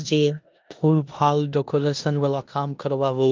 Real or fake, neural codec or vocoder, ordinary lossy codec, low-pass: fake; codec, 16 kHz in and 24 kHz out, 0.9 kbps, LongCat-Audio-Codec, four codebook decoder; Opus, 32 kbps; 7.2 kHz